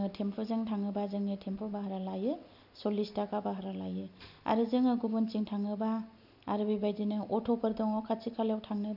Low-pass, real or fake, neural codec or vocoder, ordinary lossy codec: 5.4 kHz; real; none; none